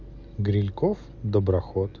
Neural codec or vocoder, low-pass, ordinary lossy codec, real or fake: none; 7.2 kHz; none; real